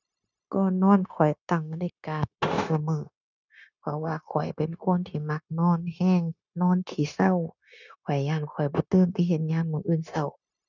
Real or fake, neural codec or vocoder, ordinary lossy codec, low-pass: fake; codec, 16 kHz, 0.9 kbps, LongCat-Audio-Codec; none; 7.2 kHz